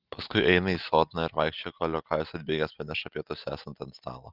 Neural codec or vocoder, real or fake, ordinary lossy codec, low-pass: none; real; Opus, 32 kbps; 5.4 kHz